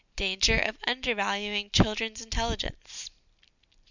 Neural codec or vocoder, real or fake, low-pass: none; real; 7.2 kHz